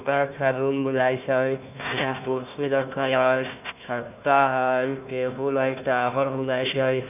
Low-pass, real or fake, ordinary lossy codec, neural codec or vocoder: 3.6 kHz; fake; none; codec, 16 kHz, 1 kbps, FunCodec, trained on Chinese and English, 50 frames a second